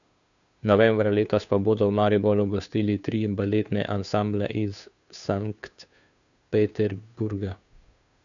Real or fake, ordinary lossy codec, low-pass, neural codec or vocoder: fake; none; 7.2 kHz; codec, 16 kHz, 2 kbps, FunCodec, trained on Chinese and English, 25 frames a second